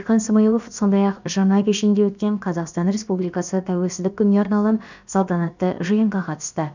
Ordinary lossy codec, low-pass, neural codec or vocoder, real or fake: none; 7.2 kHz; codec, 16 kHz, about 1 kbps, DyCAST, with the encoder's durations; fake